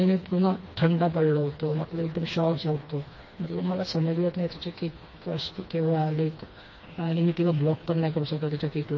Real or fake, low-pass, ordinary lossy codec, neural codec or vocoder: fake; 7.2 kHz; MP3, 32 kbps; codec, 16 kHz, 2 kbps, FreqCodec, smaller model